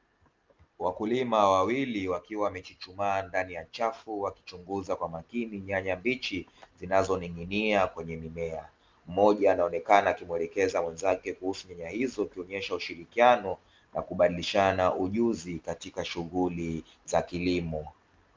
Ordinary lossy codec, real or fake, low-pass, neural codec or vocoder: Opus, 24 kbps; real; 7.2 kHz; none